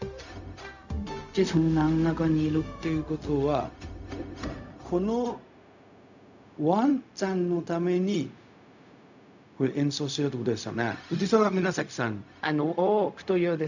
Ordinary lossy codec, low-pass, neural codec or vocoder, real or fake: none; 7.2 kHz; codec, 16 kHz, 0.4 kbps, LongCat-Audio-Codec; fake